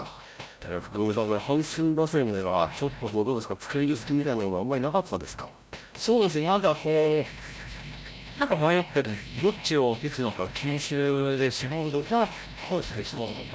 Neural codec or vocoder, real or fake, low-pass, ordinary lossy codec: codec, 16 kHz, 0.5 kbps, FreqCodec, larger model; fake; none; none